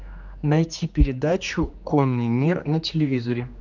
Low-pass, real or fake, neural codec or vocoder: 7.2 kHz; fake; codec, 16 kHz, 2 kbps, X-Codec, HuBERT features, trained on general audio